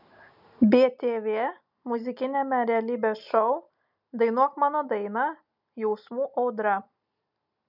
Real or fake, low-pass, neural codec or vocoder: real; 5.4 kHz; none